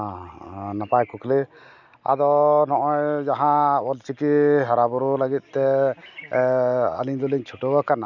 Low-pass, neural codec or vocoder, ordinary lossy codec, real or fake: 7.2 kHz; none; none; real